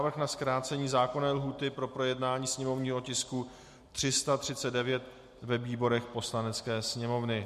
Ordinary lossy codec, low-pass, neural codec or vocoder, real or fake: MP3, 64 kbps; 14.4 kHz; none; real